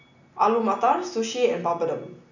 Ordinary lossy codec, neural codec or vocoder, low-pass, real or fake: none; none; 7.2 kHz; real